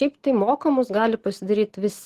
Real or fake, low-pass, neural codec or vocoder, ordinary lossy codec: real; 14.4 kHz; none; Opus, 16 kbps